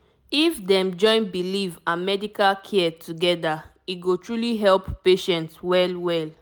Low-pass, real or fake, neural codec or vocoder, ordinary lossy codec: none; real; none; none